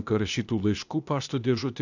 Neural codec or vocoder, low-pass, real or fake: codec, 16 kHz, 0.8 kbps, ZipCodec; 7.2 kHz; fake